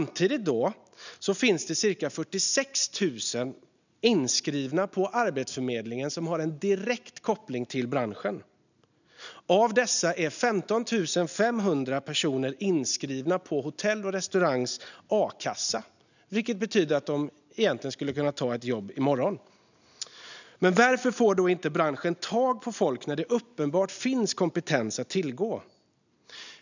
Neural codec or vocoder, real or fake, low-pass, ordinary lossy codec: none; real; 7.2 kHz; none